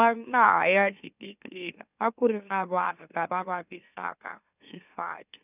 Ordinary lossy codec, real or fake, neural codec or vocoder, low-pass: none; fake; autoencoder, 44.1 kHz, a latent of 192 numbers a frame, MeloTTS; 3.6 kHz